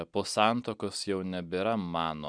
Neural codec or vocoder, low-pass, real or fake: none; 9.9 kHz; real